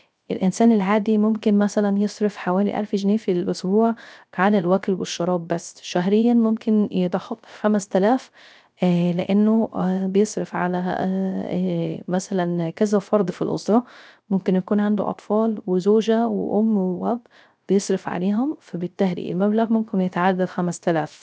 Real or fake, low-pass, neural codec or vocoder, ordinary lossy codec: fake; none; codec, 16 kHz, 0.3 kbps, FocalCodec; none